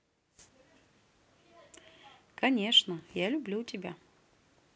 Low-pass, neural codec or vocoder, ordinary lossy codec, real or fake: none; none; none; real